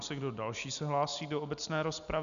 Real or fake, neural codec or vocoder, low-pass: real; none; 7.2 kHz